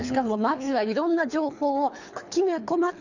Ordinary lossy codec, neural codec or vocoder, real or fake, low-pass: none; codec, 24 kHz, 3 kbps, HILCodec; fake; 7.2 kHz